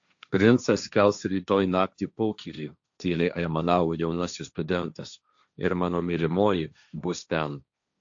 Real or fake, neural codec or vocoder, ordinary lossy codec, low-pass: fake; codec, 16 kHz, 1.1 kbps, Voila-Tokenizer; AAC, 64 kbps; 7.2 kHz